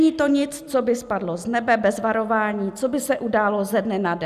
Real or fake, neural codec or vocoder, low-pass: real; none; 14.4 kHz